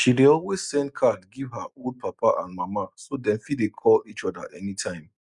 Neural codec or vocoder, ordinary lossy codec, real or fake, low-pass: none; none; real; 10.8 kHz